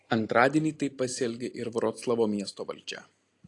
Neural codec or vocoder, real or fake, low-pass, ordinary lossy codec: none; real; 10.8 kHz; AAC, 48 kbps